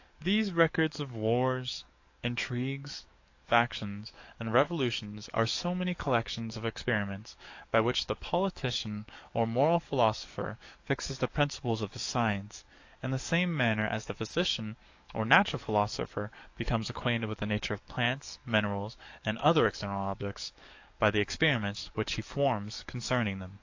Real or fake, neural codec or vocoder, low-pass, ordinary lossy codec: fake; codec, 44.1 kHz, 7.8 kbps, DAC; 7.2 kHz; AAC, 48 kbps